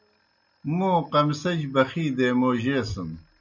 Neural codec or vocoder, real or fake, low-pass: none; real; 7.2 kHz